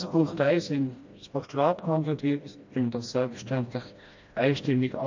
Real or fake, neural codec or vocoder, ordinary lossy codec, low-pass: fake; codec, 16 kHz, 1 kbps, FreqCodec, smaller model; MP3, 48 kbps; 7.2 kHz